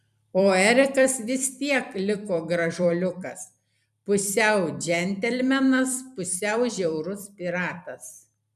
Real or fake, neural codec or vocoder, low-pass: fake; vocoder, 44.1 kHz, 128 mel bands every 256 samples, BigVGAN v2; 14.4 kHz